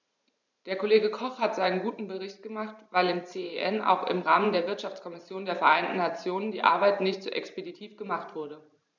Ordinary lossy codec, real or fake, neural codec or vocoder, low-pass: none; fake; vocoder, 44.1 kHz, 128 mel bands every 512 samples, BigVGAN v2; 7.2 kHz